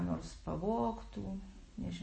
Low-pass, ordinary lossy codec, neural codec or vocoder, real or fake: 9.9 kHz; MP3, 32 kbps; none; real